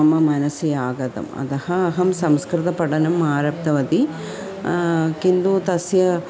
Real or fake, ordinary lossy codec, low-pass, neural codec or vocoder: real; none; none; none